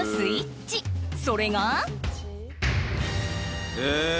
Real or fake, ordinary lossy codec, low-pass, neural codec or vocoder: real; none; none; none